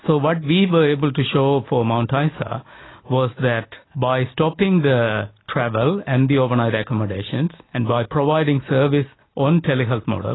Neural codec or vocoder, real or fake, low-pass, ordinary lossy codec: none; real; 7.2 kHz; AAC, 16 kbps